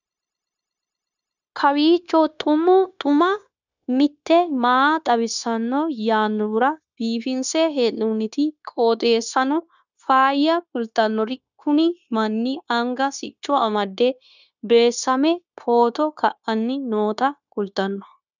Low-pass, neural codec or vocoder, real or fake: 7.2 kHz; codec, 16 kHz, 0.9 kbps, LongCat-Audio-Codec; fake